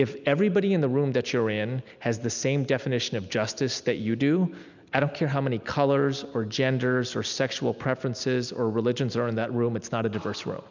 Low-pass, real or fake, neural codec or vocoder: 7.2 kHz; real; none